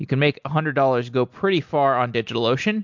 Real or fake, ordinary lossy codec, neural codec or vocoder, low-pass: real; MP3, 64 kbps; none; 7.2 kHz